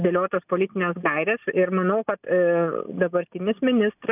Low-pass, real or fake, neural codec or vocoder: 3.6 kHz; real; none